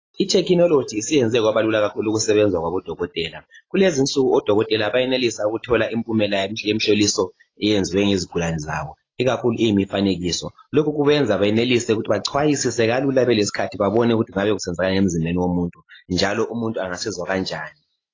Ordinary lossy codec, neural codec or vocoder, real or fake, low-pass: AAC, 32 kbps; none; real; 7.2 kHz